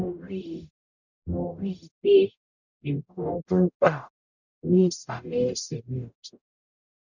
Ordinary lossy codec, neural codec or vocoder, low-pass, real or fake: none; codec, 44.1 kHz, 0.9 kbps, DAC; 7.2 kHz; fake